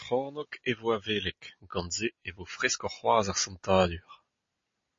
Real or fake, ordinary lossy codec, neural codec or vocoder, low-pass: real; MP3, 32 kbps; none; 7.2 kHz